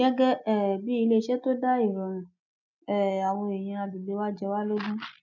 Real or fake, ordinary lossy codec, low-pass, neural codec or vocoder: real; none; 7.2 kHz; none